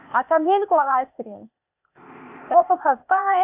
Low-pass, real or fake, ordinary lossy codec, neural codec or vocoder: 3.6 kHz; fake; none; codec, 16 kHz, 0.8 kbps, ZipCodec